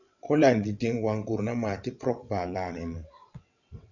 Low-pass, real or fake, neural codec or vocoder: 7.2 kHz; fake; vocoder, 22.05 kHz, 80 mel bands, WaveNeXt